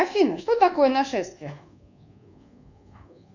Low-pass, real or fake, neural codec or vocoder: 7.2 kHz; fake; codec, 24 kHz, 1.2 kbps, DualCodec